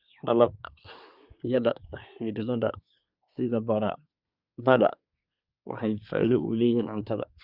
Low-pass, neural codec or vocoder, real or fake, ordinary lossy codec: 5.4 kHz; codec, 24 kHz, 1 kbps, SNAC; fake; Opus, 64 kbps